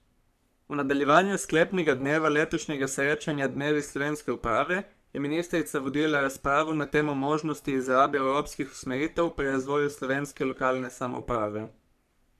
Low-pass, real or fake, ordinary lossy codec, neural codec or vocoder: 14.4 kHz; fake; none; codec, 44.1 kHz, 3.4 kbps, Pupu-Codec